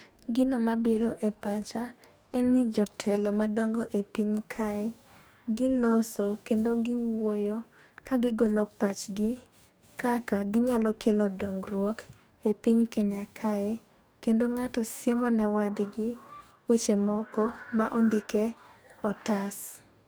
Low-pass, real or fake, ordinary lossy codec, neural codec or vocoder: none; fake; none; codec, 44.1 kHz, 2.6 kbps, DAC